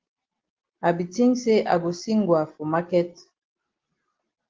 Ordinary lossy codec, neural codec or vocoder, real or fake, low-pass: Opus, 16 kbps; none; real; 7.2 kHz